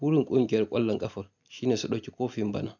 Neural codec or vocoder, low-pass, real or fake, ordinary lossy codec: none; 7.2 kHz; real; none